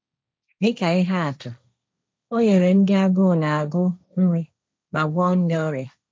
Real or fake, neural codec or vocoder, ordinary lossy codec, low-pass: fake; codec, 16 kHz, 1.1 kbps, Voila-Tokenizer; none; none